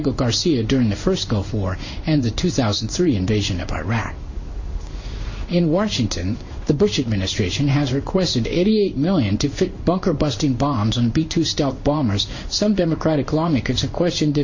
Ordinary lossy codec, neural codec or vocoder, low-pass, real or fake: Opus, 64 kbps; codec, 16 kHz in and 24 kHz out, 1 kbps, XY-Tokenizer; 7.2 kHz; fake